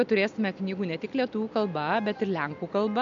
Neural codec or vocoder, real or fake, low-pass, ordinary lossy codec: none; real; 7.2 kHz; AAC, 64 kbps